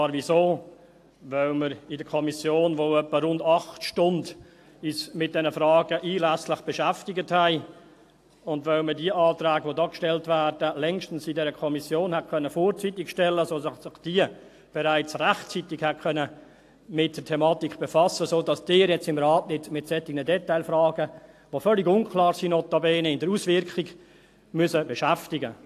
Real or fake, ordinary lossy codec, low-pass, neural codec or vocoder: real; AAC, 64 kbps; 14.4 kHz; none